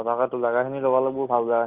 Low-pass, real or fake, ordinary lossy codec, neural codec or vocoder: 3.6 kHz; real; Opus, 24 kbps; none